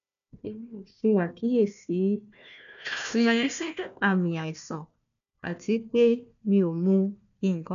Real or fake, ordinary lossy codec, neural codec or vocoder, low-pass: fake; none; codec, 16 kHz, 1 kbps, FunCodec, trained on Chinese and English, 50 frames a second; 7.2 kHz